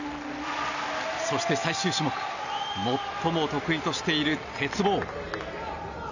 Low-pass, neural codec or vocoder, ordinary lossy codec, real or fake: 7.2 kHz; none; none; real